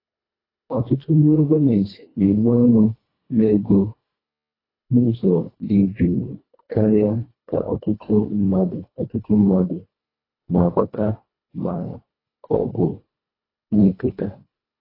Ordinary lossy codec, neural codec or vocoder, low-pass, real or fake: AAC, 24 kbps; codec, 24 kHz, 1.5 kbps, HILCodec; 5.4 kHz; fake